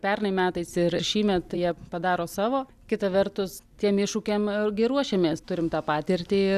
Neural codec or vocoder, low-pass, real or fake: none; 14.4 kHz; real